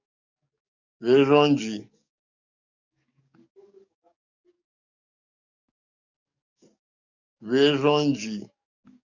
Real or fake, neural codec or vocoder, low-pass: fake; codec, 44.1 kHz, 7.8 kbps, DAC; 7.2 kHz